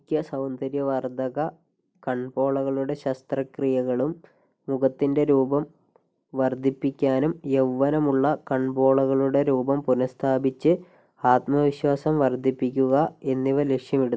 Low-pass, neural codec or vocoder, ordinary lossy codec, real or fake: none; none; none; real